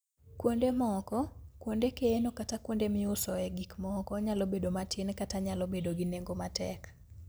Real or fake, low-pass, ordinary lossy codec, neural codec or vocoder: real; none; none; none